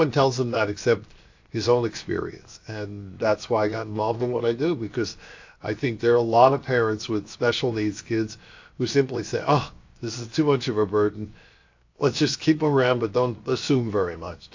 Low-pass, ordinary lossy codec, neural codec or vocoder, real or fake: 7.2 kHz; AAC, 48 kbps; codec, 16 kHz, 0.7 kbps, FocalCodec; fake